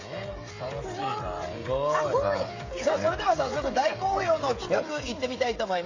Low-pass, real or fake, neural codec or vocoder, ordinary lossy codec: 7.2 kHz; fake; codec, 16 kHz, 16 kbps, FreqCodec, smaller model; AAC, 32 kbps